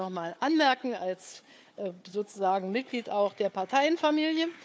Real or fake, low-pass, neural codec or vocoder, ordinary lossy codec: fake; none; codec, 16 kHz, 4 kbps, FunCodec, trained on Chinese and English, 50 frames a second; none